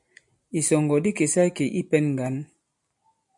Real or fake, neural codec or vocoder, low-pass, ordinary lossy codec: real; none; 10.8 kHz; MP3, 96 kbps